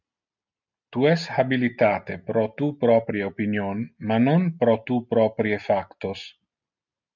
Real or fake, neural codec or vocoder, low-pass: real; none; 7.2 kHz